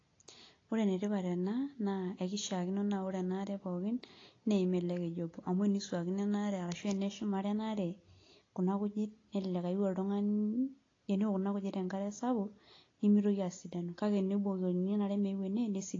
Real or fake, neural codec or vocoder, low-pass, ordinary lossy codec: real; none; 7.2 kHz; AAC, 32 kbps